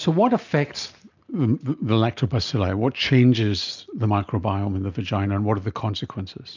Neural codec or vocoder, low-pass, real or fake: none; 7.2 kHz; real